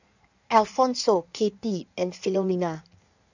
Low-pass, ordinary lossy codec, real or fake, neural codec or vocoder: 7.2 kHz; none; fake; codec, 16 kHz in and 24 kHz out, 1.1 kbps, FireRedTTS-2 codec